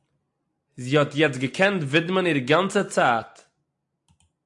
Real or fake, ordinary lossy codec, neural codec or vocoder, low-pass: real; AAC, 64 kbps; none; 10.8 kHz